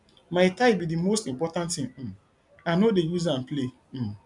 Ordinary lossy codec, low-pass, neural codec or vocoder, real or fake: none; 10.8 kHz; none; real